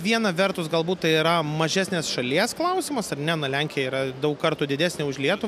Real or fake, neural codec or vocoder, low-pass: real; none; 14.4 kHz